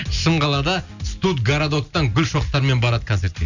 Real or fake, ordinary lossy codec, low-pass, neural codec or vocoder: real; none; 7.2 kHz; none